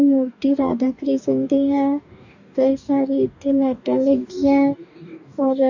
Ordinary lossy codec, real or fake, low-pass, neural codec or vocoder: none; fake; 7.2 kHz; codec, 44.1 kHz, 2.6 kbps, DAC